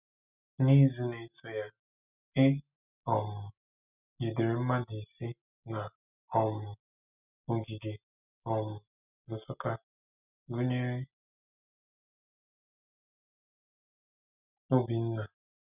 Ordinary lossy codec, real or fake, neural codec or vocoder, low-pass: none; real; none; 3.6 kHz